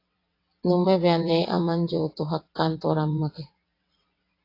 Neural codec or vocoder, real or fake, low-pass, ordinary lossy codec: vocoder, 22.05 kHz, 80 mel bands, WaveNeXt; fake; 5.4 kHz; AAC, 32 kbps